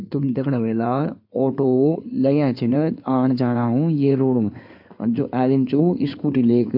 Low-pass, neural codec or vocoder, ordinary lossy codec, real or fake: 5.4 kHz; codec, 16 kHz in and 24 kHz out, 2.2 kbps, FireRedTTS-2 codec; AAC, 48 kbps; fake